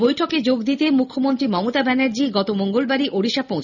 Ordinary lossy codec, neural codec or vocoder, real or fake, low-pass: none; none; real; 7.2 kHz